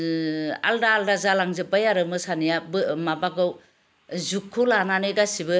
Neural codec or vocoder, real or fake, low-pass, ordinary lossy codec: none; real; none; none